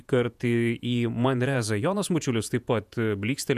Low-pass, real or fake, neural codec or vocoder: 14.4 kHz; fake; vocoder, 48 kHz, 128 mel bands, Vocos